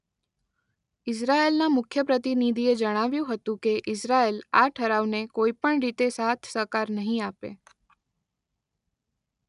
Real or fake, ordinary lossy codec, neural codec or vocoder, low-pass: real; none; none; 10.8 kHz